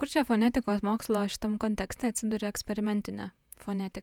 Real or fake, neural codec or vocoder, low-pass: fake; vocoder, 48 kHz, 128 mel bands, Vocos; 19.8 kHz